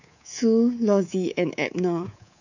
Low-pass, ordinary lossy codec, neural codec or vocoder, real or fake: 7.2 kHz; none; codec, 24 kHz, 3.1 kbps, DualCodec; fake